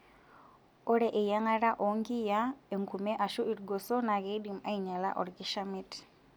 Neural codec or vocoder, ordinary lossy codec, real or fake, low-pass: none; none; real; none